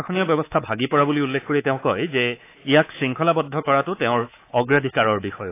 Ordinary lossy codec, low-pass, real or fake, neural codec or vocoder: AAC, 24 kbps; 3.6 kHz; fake; codec, 24 kHz, 3.1 kbps, DualCodec